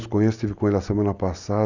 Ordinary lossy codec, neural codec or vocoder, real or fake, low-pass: none; none; real; 7.2 kHz